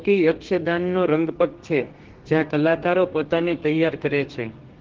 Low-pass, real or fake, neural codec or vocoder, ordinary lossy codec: 7.2 kHz; fake; codec, 32 kHz, 1.9 kbps, SNAC; Opus, 16 kbps